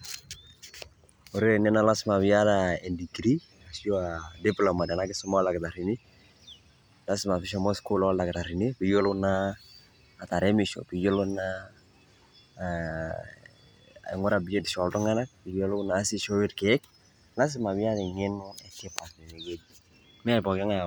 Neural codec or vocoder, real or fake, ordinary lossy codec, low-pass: none; real; none; none